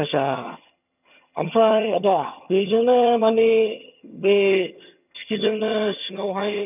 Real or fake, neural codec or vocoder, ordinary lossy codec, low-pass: fake; vocoder, 22.05 kHz, 80 mel bands, HiFi-GAN; none; 3.6 kHz